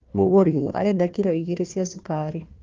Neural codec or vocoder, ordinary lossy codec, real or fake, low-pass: codec, 16 kHz, 1 kbps, FunCodec, trained on Chinese and English, 50 frames a second; Opus, 32 kbps; fake; 7.2 kHz